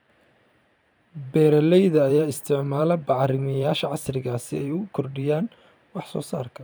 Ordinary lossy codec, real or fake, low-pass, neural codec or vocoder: none; fake; none; vocoder, 44.1 kHz, 128 mel bands every 512 samples, BigVGAN v2